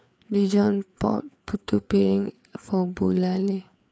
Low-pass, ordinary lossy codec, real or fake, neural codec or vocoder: none; none; fake; codec, 16 kHz, 4 kbps, FreqCodec, larger model